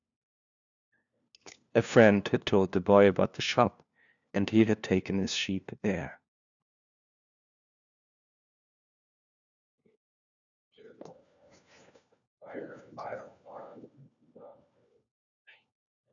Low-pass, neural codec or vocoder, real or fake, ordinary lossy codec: 7.2 kHz; codec, 16 kHz, 1 kbps, FunCodec, trained on LibriTTS, 50 frames a second; fake; MP3, 96 kbps